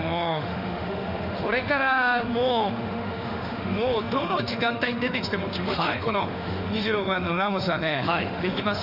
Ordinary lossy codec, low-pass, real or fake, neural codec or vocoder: none; 5.4 kHz; fake; autoencoder, 48 kHz, 32 numbers a frame, DAC-VAE, trained on Japanese speech